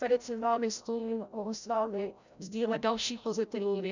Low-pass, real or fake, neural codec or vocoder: 7.2 kHz; fake; codec, 16 kHz, 0.5 kbps, FreqCodec, larger model